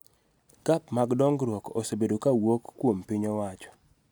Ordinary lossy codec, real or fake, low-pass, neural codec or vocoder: none; real; none; none